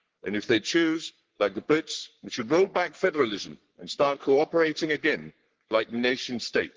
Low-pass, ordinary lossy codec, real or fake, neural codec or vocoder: 7.2 kHz; Opus, 16 kbps; fake; codec, 44.1 kHz, 3.4 kbps, Pupu-Codec